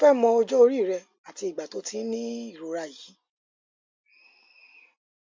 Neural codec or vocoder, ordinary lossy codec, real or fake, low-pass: none; MP3, 64 kbps; real; 7.2 kHz